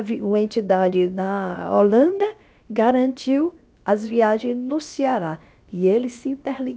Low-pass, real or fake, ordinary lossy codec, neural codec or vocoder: none; fake; none; codec, 16 kHz, about 1 kbps, DyCAST, with the encoder's durations